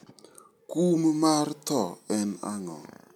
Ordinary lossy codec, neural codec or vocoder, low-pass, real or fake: none; none; 19.8 kHz; real